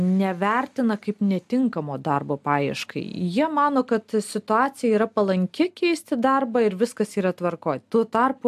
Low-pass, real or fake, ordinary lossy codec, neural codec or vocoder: 14.4 kHz; real; AAC, 96 kbps; none